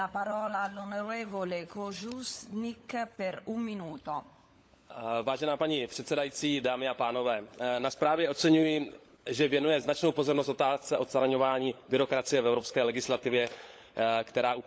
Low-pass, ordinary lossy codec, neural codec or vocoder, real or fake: none; none; codec, 16 kHz, 16 kbps, FunCodec, trained on LibriTTS, 50 frames a second; fake